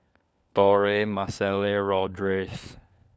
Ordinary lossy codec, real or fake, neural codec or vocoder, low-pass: none; fake; codec, 16 kHz, 4 kbps, FunCodec, trained on LibriTTS, 50 frames a second; none